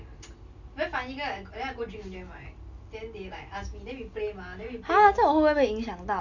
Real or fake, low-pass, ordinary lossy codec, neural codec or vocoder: real; 7.2 kHz; none; none